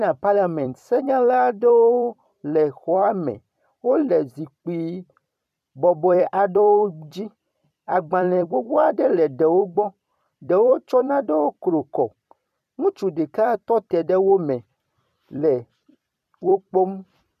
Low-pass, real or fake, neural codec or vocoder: 14.4 kHz; fake; vocoder, 44.1 kHz, 128 mel bands every 256 samples, BigVGAN v2